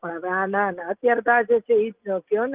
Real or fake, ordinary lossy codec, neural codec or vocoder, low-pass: real; Opus, 32 kbps; none; 3.6 kHz